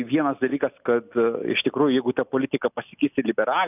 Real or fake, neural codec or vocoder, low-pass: real; none; 3.6 kHz